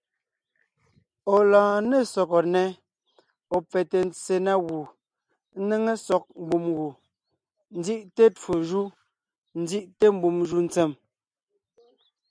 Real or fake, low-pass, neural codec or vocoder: real; 9.9 kHz; none